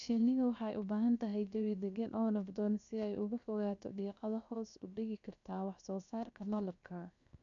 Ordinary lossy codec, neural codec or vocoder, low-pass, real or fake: none; codec, 16 kHz, 0.7 kbps, FocalCodec; 7.2 kHz; fake